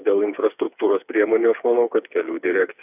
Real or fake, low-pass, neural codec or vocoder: fake; 3.6 kHz; codec, 16 kHz, 4 kbps, FreqCodec, smaller model